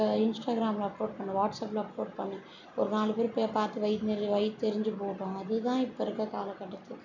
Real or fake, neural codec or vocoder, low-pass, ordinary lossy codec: real; none; 7.2 kHz; none